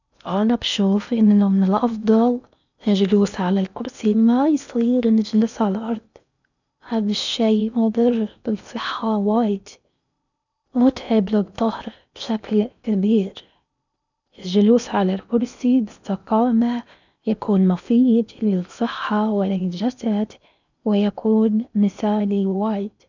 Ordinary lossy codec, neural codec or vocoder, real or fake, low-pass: none; codec, 16 kHz in and 24 kHz out, 0.8 kbps, FocalCodec, streaming, 65536 codes; fake; 7.2 kHz